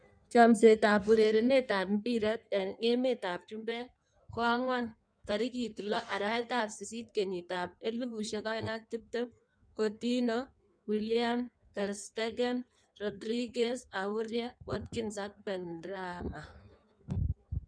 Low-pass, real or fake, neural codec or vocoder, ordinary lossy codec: 9.9 kHz; fake; codec, 16 kHz in and 24 kHz out, 1.1 kbps, FireRedTTS-2 codec; none